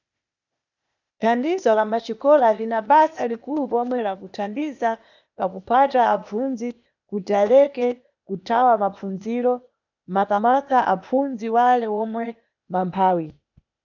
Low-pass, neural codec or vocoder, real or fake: 7.2 kHz; codec, 16 kHz, 0.8 kbps, ZipCodec; fake